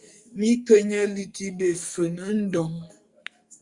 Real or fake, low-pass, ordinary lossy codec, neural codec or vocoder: fake; 10.8 kHz; Opus, 64 kbps; codec, 44.1 kHz, 2.6 kbps, SNAC